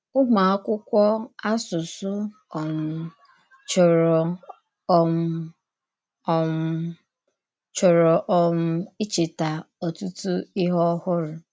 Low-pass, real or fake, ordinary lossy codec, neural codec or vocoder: none; real; none; none